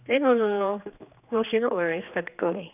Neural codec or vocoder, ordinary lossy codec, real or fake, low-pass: codec, 16 kHz, 2 kbps, X-Codec, HuBERT features, trained on general audio; none; fake; 3.6 kHz